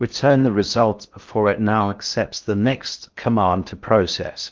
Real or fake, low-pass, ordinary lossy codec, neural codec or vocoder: fake; 7.2 kHz; Opus, 24 kbps; codec, 16 kHz in and 24 kHz out, 0.6 kbps, FocalCodec, streaming, 4096 codes